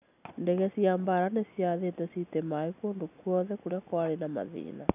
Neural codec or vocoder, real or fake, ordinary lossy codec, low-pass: none; real; none; 3.6 kHz